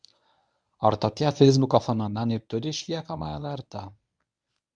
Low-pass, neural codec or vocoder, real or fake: 9.9 kHz; codec, 24 kHz, 0.9 kbps, WavTokenizer, medium speech release version 1; fake